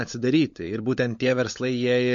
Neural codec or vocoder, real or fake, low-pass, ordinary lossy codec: codec, 16 kHz, 16 kbps, FunCodec, trained on LibriTTS, 50 frames a second; fake; 7.2 kHz; MP3, 48 kbps